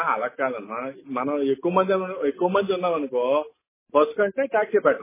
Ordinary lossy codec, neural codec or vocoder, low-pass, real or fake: MP3, 16 kbps; none; 3.6 kHz; real